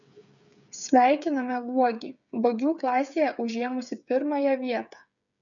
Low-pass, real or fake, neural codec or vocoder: 7.2 kHz; fake; codec, 16 kHz, 8 kbps, FreqCodec, smaller model